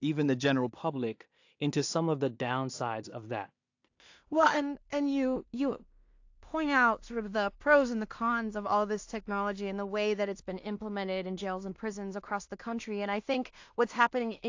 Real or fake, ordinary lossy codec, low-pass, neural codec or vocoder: fake; AAC, 48 kbps; 7.2 kHz; codec, 16 kHz in and 24 kHz out, 0.4 kbps, LongCat-Audio-Codec, two codebook decoder